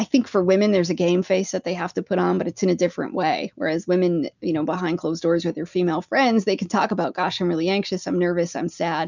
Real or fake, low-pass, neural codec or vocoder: real; 7.2 kHz; none